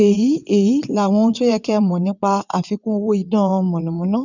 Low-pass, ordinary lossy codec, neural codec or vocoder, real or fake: 7.2 kHz; none; vocoder, 22.05 kHz, 80 mel bands, WaveNeXt; fake